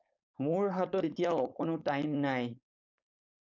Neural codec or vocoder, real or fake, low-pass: codec, 16 kHz, 4.8 kbps, FACodec; fake; 7.2 kHz